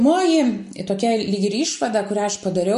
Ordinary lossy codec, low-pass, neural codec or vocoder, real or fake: MP3, 64 kbps; 10.8 kHz; none; real